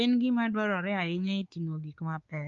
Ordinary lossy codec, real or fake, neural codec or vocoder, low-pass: Opus, 32 kbps; fake; codec, 16 kHz, 4 kbps, X-Codec, WavLM features, trained on Multilingual LibriSpeech; 7.2 kHz